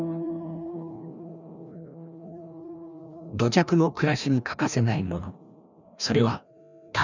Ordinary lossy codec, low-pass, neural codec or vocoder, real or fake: none; 7.2 kHz; codec, 16 kHz, 1 kbps, FreqCodec, larger model; fake